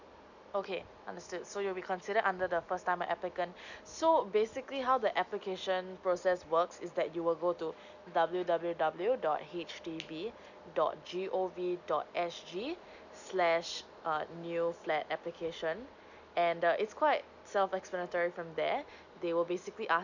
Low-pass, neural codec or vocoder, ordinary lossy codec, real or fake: 7.2 kHz; none; none; real